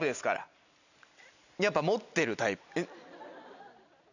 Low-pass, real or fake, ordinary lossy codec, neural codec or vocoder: 7.2 kHz; real; none; none